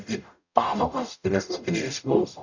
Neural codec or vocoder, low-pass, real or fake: codec, 44.1 kHz, 0.9 kbps, DAC; 7.2 kHz; fake